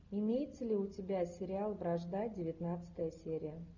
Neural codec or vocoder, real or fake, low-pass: none; real; 7.2 kHz